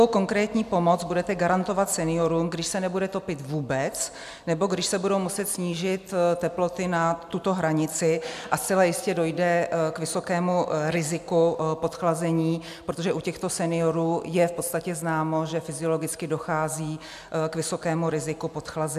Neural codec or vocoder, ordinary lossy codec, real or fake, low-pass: none; MP3, 96 kbps; real; 14.4 kHz